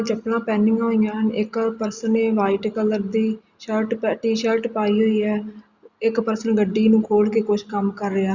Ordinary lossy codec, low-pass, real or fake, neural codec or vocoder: Opus, 64 kbps; 7.2 kHz; real; none